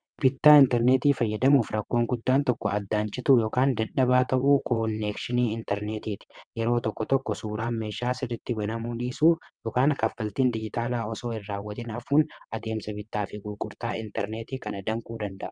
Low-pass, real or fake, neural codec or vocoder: 9.9 kHz; fake; vocoder, 22.05 kHz, 80 mel bands, WaveNeXt